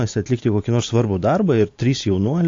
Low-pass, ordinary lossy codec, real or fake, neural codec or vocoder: 7.2 kHz; AAC, 48 kbps; real; none